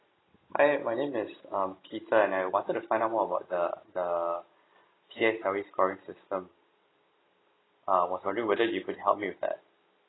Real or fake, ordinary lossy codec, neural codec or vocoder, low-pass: real; AAC, 16 kbps; none; 7.2 kHz